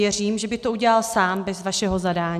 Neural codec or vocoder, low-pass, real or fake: none; 14.4 kHz; real